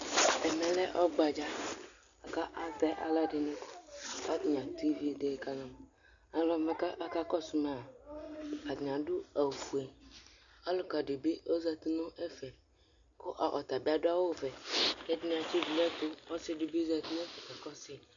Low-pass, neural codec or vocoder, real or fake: 7.2 kHz; none; real